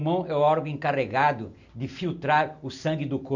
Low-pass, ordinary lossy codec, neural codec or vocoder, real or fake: 7.2 kHz; none; none; real